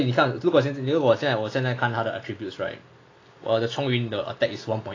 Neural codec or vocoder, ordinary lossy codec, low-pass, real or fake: none; AAC, 32 kbps; 7.2 kHz; real